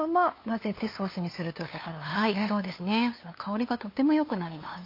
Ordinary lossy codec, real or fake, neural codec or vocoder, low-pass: MP3, 32 kbps; fake; codec, 16 kHz, 2 kbps, FunCodec, trained on LibriTTS, 25 frames a second; 5.4 kHz